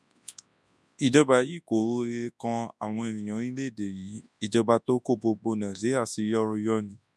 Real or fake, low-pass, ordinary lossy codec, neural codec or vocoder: fake; none; none; codec, 24 kHz, 0.9 kbps, WavTokenizer, large speech release